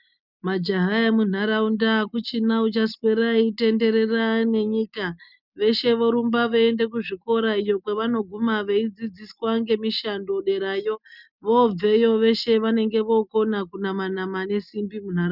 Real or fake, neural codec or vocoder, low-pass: real; none; 5.4 kHz